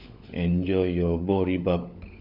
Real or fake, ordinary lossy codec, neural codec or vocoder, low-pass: fake; none; codec, 16 kHz, 16 kbps, FunCodec, trained on LibriTTS, 50 frames a second; 5.4 kHz